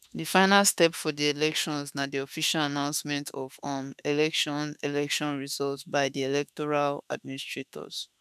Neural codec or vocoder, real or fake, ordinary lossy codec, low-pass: autoencoder, 48 kHz, 32 numbers a frame, DAC-VAE, trained on Japanese speech; fake; none; 14.4 kHz